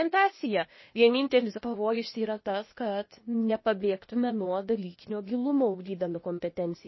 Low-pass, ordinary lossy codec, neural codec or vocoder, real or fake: 7.2 kHz; MP3, 24 kbps; codec, 16 kHz, 0.8 kbps, ZipCodec; fake